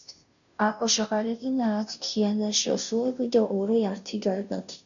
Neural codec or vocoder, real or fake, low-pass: codec, 16 kHz, 0.5 kbps, FunCodec, trained on Chinese and English, 25 frames a second; fake; 7.2 kHz